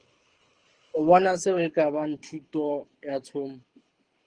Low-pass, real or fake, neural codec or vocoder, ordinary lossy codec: 9.9 kHz; fake; codec, 24 kHz, 6 kbps, HILCodec; Opus, 24 kbps